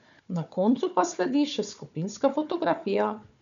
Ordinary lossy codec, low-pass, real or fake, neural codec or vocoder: none; 7.2 kHz; fake; codec, 16 kHz, 4 kbps, FunCodec, trained on Chinese and English, 50 frames a second